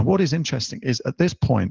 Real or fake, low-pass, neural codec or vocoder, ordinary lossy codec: real; 7.2 kHz; none; Opus, 16 kbps